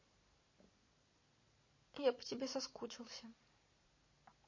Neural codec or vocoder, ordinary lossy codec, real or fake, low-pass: none; MP3, 32 kbps; real; 7.2 kHz